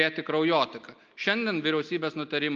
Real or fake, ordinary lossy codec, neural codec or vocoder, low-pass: real; Opus, 24 kbps; none; 7.2 kHz